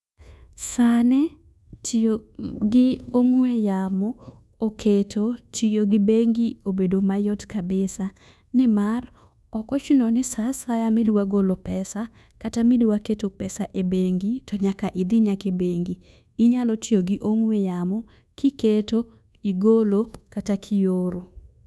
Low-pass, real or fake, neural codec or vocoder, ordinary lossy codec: none; fake; codec, 24 kHz, 1.2 kbps, DualCodec; none